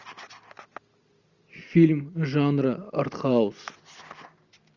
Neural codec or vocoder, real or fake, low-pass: none; real; 7.2 kHz